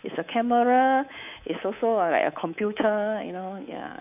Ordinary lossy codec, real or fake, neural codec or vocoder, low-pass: none; fake; codec, 24 kHz, 3.1 kbps, DualCodec; 3.6 kHz